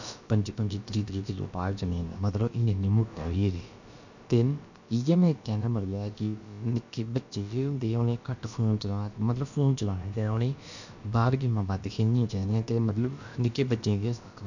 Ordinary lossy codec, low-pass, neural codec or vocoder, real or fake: none; 7.2 kHz; codec, 16 kHz, about 1 kbps, DyCAST, with the encoder's durations; fake